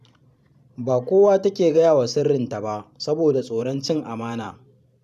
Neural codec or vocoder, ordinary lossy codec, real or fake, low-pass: vocoder, 44.1 kHz, 128 mel bands every 512 samples, BigVGAN v2; none; fake; 14.4 kHz